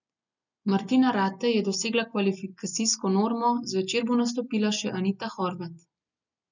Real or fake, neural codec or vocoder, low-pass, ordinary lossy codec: real; none; 7.2 kHz; none